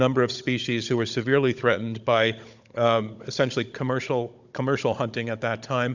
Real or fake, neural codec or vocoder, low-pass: fake; codec, 16 kHz, 16 kbps, FunCodec, trained on Chinese and English, 50 frames a second; 7.2 kHz